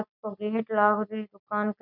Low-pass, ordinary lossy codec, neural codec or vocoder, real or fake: 5.4 kHz; none; none; real